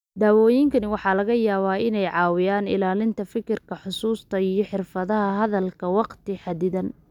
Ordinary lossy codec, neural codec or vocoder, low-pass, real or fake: none; none; 19.8 kHz; real